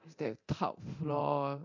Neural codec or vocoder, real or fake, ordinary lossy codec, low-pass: codec, 24 kHz, 0.9 kbps, DualCodec; fake; MP3, 48 kbps; 7.2 kHz